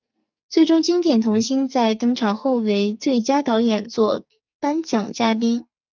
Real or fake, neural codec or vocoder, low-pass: fake; codec, 44.1 kHz, 2.6 kbps, SNAC; 7.2 kHz